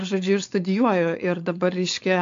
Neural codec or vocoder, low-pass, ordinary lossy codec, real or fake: codec, 16 kHz, 4.8 kbps, FACodec; 7.2 kHz; AAC, 64 kbps; fake